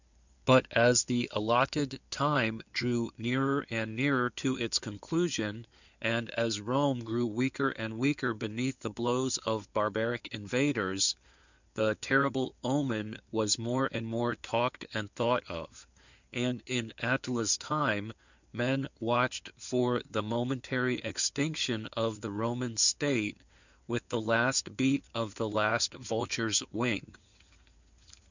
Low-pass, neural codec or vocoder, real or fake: 7.2 kHz; codec, 16 kHz in and 24 kHz out, 2.2 kbps, FireRedTTS-2 codec; fake